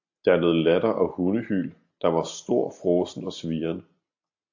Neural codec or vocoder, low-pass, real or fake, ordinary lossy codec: none; 7.2 kHz; real; AAC, 48 kbps